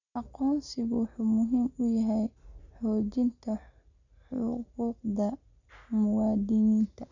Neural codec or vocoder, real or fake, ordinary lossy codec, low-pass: none; real; none; 7.2 kHz